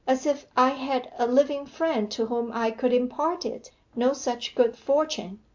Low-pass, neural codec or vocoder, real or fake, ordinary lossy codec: 7.2 kHz; none; real; MP3, 64 kbps